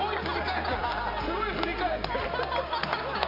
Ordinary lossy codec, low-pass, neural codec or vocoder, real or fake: none; 5.4 kHz; none; real